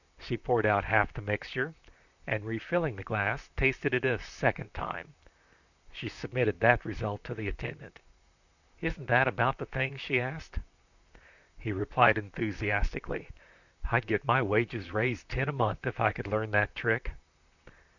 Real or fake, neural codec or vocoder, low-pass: fake; vocoder, 44.1 kHz, 128 mel bands, Pupu-Vocoder; 7.2 kHz